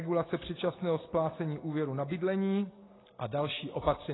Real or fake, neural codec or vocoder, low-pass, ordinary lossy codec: real; none; 7.2 kHz; AAC, 16 kbps